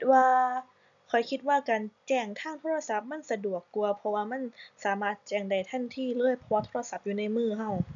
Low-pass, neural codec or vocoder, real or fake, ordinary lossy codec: 7.2 kHz; none; real; none